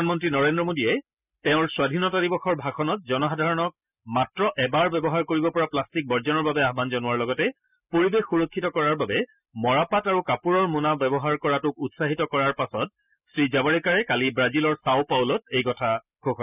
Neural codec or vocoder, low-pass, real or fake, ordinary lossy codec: none; 3.6 kHz; real; none